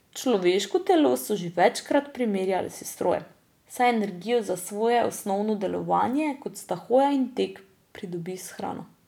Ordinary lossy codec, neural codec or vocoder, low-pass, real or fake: none; none; 19.8 kHz; real